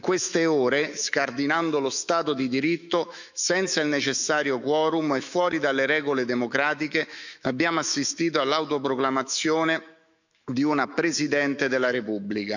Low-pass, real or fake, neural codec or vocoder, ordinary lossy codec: 7.2 kHz; fake; autoencoder, 48 kHz, 128 numbers a frame, DAC-VAE, trained on Japanese speech; none